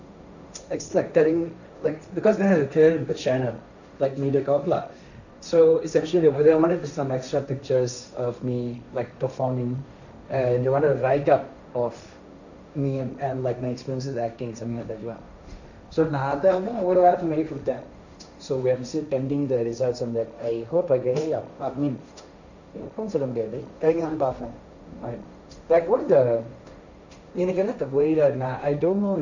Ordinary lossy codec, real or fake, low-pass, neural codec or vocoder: none; fake; 7.2 kHz; codec, 16 kHz, 1.1 kbps, Voila-Tokenizer